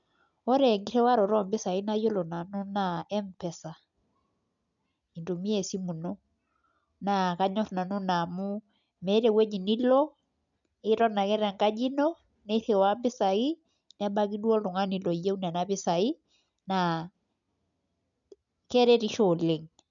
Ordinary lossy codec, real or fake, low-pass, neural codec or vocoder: none; real; 7.2 kHz; none